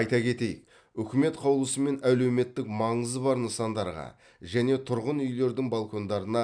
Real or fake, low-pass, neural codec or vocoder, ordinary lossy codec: real; 9.9 kHz; none; none